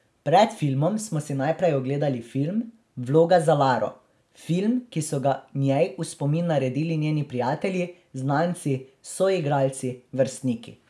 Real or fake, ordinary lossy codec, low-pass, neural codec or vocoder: real; none; none; none